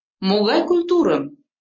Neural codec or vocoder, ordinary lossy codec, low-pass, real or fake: none; MP3, 32 kbps; 7.2 kHz; real